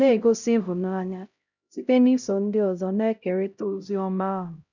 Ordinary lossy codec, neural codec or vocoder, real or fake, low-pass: none; codec, 16 kHz, 0.5 kbps, X-Codec, HuBERT features, trained on LibriSpeech; fake; 7.2 kHz